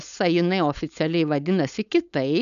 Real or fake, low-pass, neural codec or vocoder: fake; 7.2 kHz; codec, 16 kHz, 4.8 kbps, FACodec